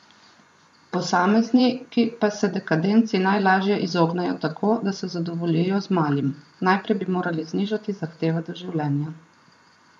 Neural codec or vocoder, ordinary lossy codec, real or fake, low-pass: vocoder, 24 kHz, 100 mel bands, Vocos; none; fake; none